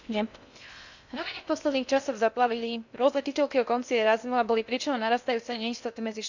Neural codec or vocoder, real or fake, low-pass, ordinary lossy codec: codec, 16 kHz in and 24 kHz out, 0.6 kbps, FocalCodec, streaming, 2048 codes; fake; 7.2 kHz; none